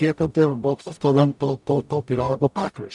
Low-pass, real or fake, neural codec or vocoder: 10.8 kHz; fake; codec, 44.1 kHz, 0.9 kbps, DAC